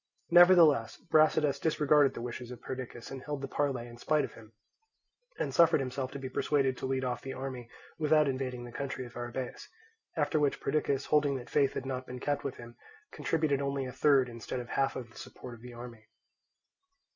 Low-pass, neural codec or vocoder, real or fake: 7.2 kHz; none; real